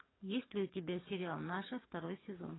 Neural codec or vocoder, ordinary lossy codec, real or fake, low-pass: codec, 44.1 kHz, 7.8 kbps, DAC; AAC, 16 kbps; fake; 7.2 kHz